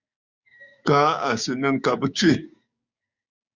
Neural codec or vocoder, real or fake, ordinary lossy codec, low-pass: codec, 16 kHz, 6 kbps, DAC; fake; Opus, 64 kbps; 7.2 kHz